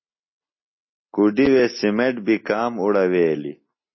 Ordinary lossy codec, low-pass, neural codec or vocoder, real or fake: MP3, 24 kbps; 7.2 kHz; none; real